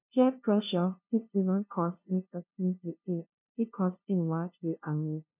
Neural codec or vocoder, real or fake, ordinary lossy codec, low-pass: codec, 16 kHz, 0.5 kbps, FunCodec, trained on LibriTTS, 25 frames a second; fake; none; 3.6 kHz